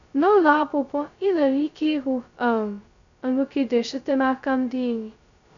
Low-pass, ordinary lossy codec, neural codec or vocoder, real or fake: 7.2 kHz; none; codec, 16 kHz, 0.2 kbps, FocalCodec; fake